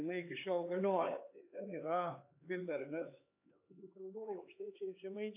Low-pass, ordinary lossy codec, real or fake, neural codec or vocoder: 3.6 kHz; AAC, 32 kbps; fake; codec, 16 kHz, 4 kbps, X-Codec, HuBERT features, trained on LibriSpeech